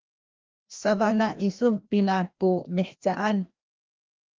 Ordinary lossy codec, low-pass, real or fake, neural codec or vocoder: Opus, 32 kbps; 7.2 kHz; fake; codec, 16 kHz, 1 kbps, FreqCodec, larger model